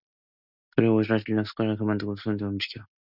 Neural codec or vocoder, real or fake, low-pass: none; real; 5.4 kHz